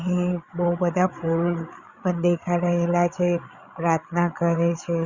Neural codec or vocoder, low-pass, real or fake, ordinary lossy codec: codec, 16 kHz, 8 kbps, FreqCodec, larger model; 7.2 kHz; fake; Opus, 64 kbps